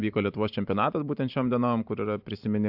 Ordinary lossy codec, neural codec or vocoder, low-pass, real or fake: AAC, 48 kbps; vocoder, 44.1 kHz, 128 mel bands every 512 samples, BigVGAN v2; 5.4 kHz; fake